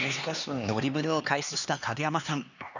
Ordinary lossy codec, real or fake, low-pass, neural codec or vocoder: none; fake; 7.2 kHz; codec, 16 kHz, 2 kbps, X-Codec, HuBERT features, trained on LibriSpeech